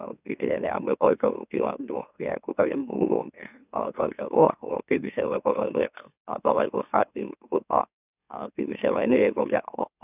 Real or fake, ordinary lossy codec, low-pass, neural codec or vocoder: fake; none; 3.6 kHz; autoencoder, 44.1 kHz, a latent of 192 numbers a frame, MeloTTS